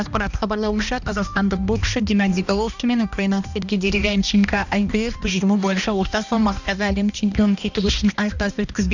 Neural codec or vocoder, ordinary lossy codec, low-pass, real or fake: codec, 16 kHz, 1 kbps, X-Codec, HuBERT features, trained on balanced general audio; none; 7.2 kHz; fake